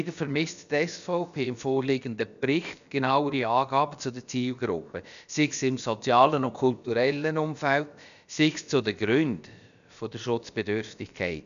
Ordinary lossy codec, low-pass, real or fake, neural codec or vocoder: none; 7.2 kHz; fake; codec, 16 kHz, about 1 kbps, DyCAST, with the encoder's durations